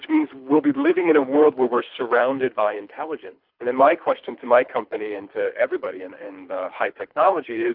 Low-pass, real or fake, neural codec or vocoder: 5.4 kHz; fake; codec, 24 kHz, 3 kbps, HILCodec